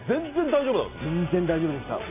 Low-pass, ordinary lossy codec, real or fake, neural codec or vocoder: 3.6 kHz; AAC, 24 kbps; real; none